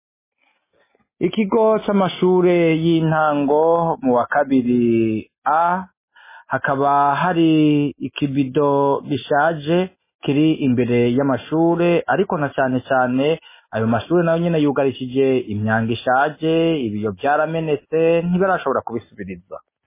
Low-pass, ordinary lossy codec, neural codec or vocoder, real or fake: 3.6 kHz; MP3, 16 kbps; none; real